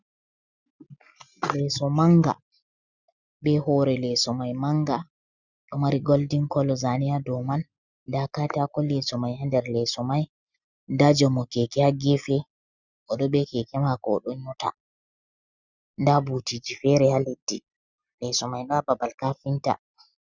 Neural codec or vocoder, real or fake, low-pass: none; real; 7.2 kHz